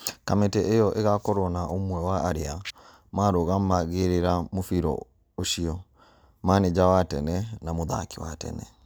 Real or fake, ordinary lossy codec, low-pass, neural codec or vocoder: real; none; none; none